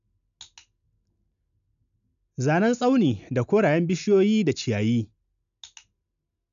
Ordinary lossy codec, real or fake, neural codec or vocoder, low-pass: none; real; none; 7.2 kHz